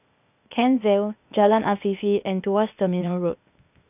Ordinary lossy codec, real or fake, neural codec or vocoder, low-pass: none; fake; codec, 16 kHz, 0.8 kbps, ZipCodec; 3.6 kHz